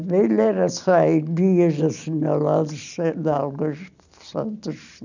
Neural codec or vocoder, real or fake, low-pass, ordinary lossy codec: none; real; 7.2 kHz; none